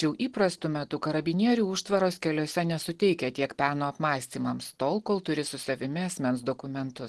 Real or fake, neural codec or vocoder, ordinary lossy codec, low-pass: real; none; Opus, 16 kbps; 10.8 kHz